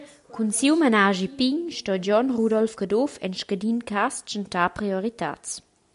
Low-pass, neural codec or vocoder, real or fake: 10.8 kHz; none; real